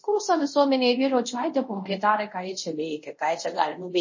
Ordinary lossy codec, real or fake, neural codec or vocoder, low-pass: MP3, 32 kbps; fake; codec, 24 kHz, 0.5 kbps, DualCodec; 7.2 kHz